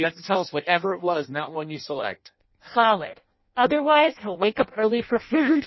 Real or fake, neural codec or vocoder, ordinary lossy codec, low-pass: fake; codec, 16 kHz in and 24 kHz out, 0.6 kbps, FireRedTTS-2 codec; MP3, 24 kbps; 7.2 kHz